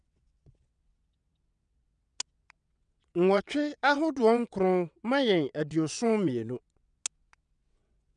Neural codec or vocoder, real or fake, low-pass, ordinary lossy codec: vocoder, 22.05 kHz, 80 mel bands, WaveNeXt; fake; 9.9 kHz; none